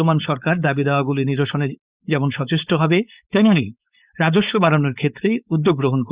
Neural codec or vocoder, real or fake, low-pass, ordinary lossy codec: codec, 16 kHz, 4.8 kbps, FACodec; fake; 3.6 kHz; Opus, 64 kbps